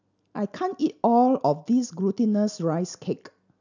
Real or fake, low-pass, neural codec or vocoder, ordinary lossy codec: real; 7.2 kHz; none; none